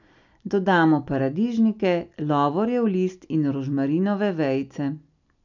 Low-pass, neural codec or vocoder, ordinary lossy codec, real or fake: 7.2 kHz; none; none; real